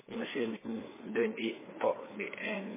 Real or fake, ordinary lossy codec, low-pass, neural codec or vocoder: fake; MP3, 16 kbps; 3.6 kHz; codec, 16 kHz, 4 kbps, FreqCodec, larger model